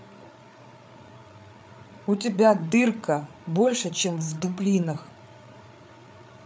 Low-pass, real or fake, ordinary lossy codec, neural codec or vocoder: none; fake; none; codec, 16 kHz, 8 kbps, FreqCodec, larger model